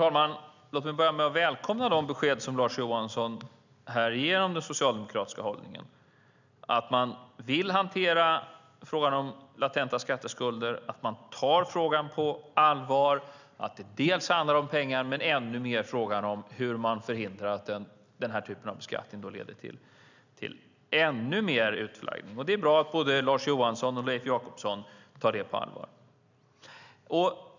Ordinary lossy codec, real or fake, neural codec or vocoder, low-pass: none; real; none; 7.2 kHz